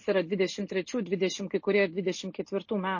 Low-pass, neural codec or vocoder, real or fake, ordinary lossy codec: 7.2 kHz; none; real; MP3, 32 kbps